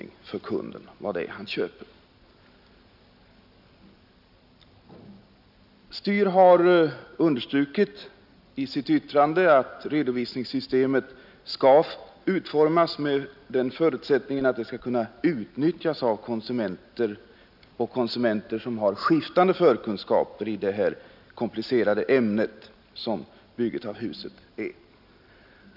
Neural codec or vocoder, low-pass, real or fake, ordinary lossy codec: none; 5.4 kHz; real; none